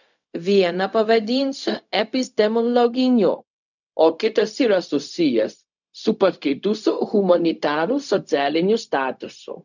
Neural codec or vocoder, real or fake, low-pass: codec, 16 kHz, 0.4 kbps, LongCat-Audio-Codec; fake; 7.2 kHz